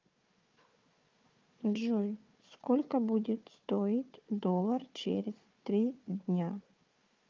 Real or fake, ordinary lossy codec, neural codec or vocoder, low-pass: fake; Opus, 24 kbps; codec, 16 kHz, 4 kbps, FunCodec, trained on Chinese and English, 50 frames a second; 7.2 kHz